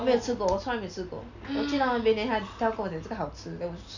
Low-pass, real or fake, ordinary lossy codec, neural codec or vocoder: 7.2 kHz; real; none; none